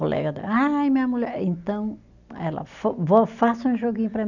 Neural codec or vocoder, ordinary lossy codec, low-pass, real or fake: none; none; 7.2 kHz; real